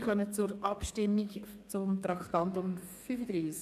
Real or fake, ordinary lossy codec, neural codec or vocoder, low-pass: fake; MP3, 96 kbps; codec, 32 kHz, 1.9 kbps, SNAC; 14.4 kHz